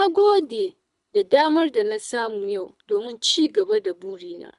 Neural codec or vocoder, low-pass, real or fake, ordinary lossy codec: codec, 24 kHz, 3 kbps, HILCodec; 10.8 kHz; fake; none